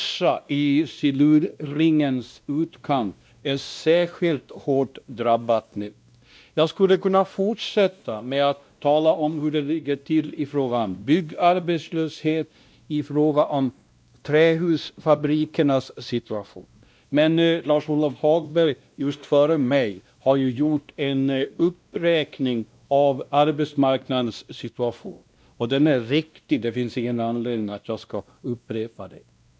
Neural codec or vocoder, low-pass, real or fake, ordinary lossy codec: codec, 16 kHz, 1 kbps, X-Codec, WavLM features, trained on Multilingual LibriSpeech; none; fake; none